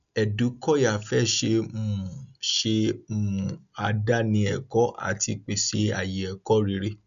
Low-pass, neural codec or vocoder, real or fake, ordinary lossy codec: 7.2 kHz; none; real; none